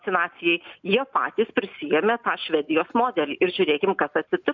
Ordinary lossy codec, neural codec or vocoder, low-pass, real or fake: MP3, 64 kbps; none; 7.2 kHz; real